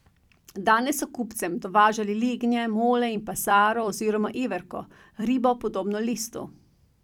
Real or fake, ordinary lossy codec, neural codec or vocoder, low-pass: fake; none; vocoder, 44.1 kHz, 128 mel bands every 256 samples, BigVGAN v2; 19.8 kHz